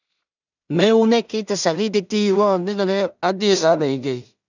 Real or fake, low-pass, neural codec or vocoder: fake; 7.2 kHz; codec, 16 kHz in and 24 kHz out, 0.4 kbps, LongCat-Audio-Codec, two codebook decoder